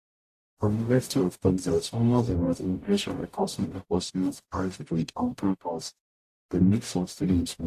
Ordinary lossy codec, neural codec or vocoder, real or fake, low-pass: AAC, 64 kbps; codec, 44.1 kHz, 0.9 kbps, DAC; fake; 14.4 kHz